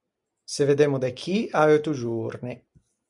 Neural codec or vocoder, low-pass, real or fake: none; 10.8 kHz; real